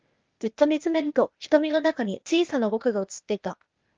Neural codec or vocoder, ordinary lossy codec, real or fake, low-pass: codec, 16 kHz, 0.8 kbps, ZipCodec; Opus, 24 kbps; fake; 7.2 kHz